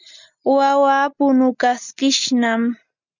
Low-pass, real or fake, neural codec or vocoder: 7.2 kHz; real; none